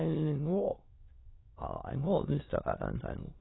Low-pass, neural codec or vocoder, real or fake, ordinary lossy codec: 7.2 kHz; autoencoder, 22.05 kHz, a latent of 192 numbers a frame, VITS, trained on many speakers; fake; AAC, 16 kbps